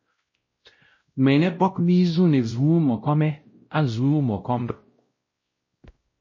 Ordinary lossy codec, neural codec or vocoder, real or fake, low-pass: MP3, 32 kbps; codec, 16 kHz, 0.5 kbps, X-Codec, WavLM features, trained on Multilingual LibriSpeech; fake; 7.2 kHz